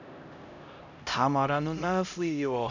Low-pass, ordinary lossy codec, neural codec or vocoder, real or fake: 7.2 kHz; none; codec, 16 kHz, 0.5 kbps, X-Codec, HuBERT features, trained on LibriSpeech; fake